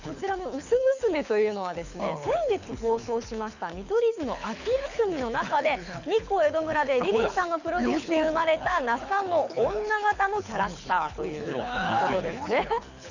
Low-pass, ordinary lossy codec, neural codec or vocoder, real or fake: 7.2 kHz; none; codec, 24 kHz, 6 kbps, HILCodec; fake